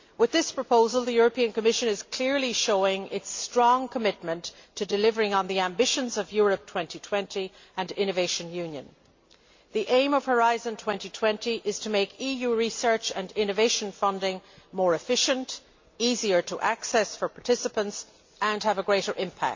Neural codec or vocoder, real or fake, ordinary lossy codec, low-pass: none; real; MP3, 48 kbps; 7.2 kHz